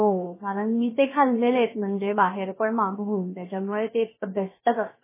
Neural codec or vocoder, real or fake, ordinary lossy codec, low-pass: codec, 16 kHz, 0.7 kbps, FocalCodec; fake; MP3, 16 kbps; 3.6 kHz